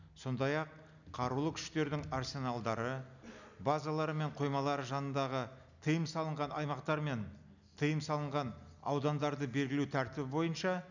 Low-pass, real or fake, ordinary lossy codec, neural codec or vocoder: 7.2 kHz; real; none; none